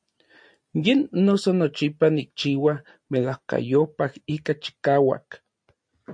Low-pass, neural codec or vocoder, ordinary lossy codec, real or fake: 9.9 kHz; none; MP3, 48 kbps; real